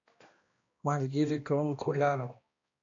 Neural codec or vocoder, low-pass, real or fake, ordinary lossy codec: codec, 16 kHz, 1 kbps, X-Codec, HuBERT features, trained on balanced general audio; 7.2 kHz; fake; MP3, 48 kbps